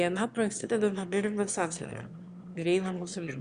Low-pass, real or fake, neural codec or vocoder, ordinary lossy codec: 9.9 kHz; fake; autoencoder, 22.05 kHz, a latent of 192 numbers a frame, VITS, trained on one speaker; Opus, 64 kbps